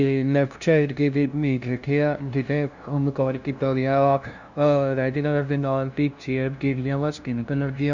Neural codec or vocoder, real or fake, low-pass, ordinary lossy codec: codec, 16 kHz, 0.5 kbps, FunCodec, trained on LibriTTS, 25 frames a second; fake; 7.2 kHz; Opus, 64 kbps